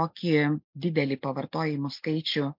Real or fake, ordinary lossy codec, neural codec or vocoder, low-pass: real; MP3, 48 kbps; none; 5.4 kHz